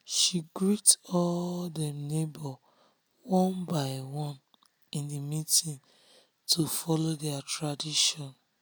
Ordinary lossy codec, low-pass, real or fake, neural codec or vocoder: none; none; real; none